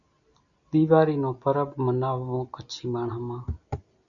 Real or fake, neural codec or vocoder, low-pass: real; none; 7.2 kHz